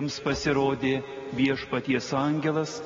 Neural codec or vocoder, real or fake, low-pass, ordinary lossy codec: none; real; 7.2 kHz; AAC, 24 kbps